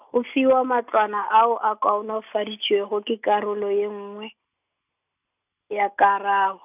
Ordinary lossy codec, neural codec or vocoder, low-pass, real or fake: none; none; 3.6 kHz; real